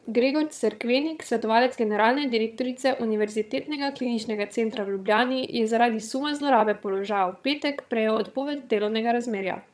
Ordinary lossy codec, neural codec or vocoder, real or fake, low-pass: none; vocoder, 22.05 kHz, 80 mel bands, HiFi-GAN; fake; none